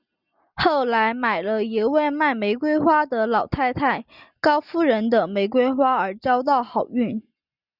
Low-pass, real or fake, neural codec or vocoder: 5.4 kHz; real; none